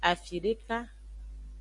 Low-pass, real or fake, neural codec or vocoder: 10.8 kHz; real; none